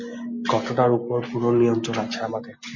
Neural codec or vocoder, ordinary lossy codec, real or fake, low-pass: none; MP3, 32 kbps; real; 7.2 kHz